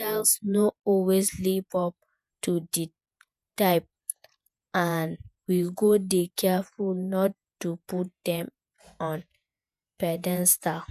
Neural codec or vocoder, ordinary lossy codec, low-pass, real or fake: vocoder, 48 kHz, 128 mel bands, Vocos; none; 14.4 kHz; fake